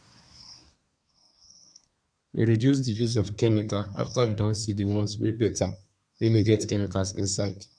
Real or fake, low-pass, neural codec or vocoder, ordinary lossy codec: fake; 9.9 kHz; codec, 24 kHz, 1 kbps, SNAC; none